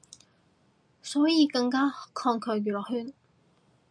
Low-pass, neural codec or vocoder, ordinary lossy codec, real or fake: 9.9 kHz; none; MP3, 96 kbps; real